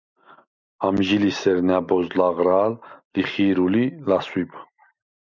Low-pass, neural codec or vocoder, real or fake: 7.2 kHz; none; real